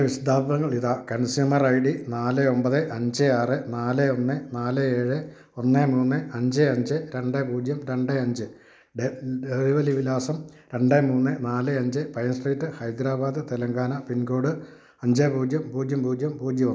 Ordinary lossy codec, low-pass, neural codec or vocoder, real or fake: none; none; none; real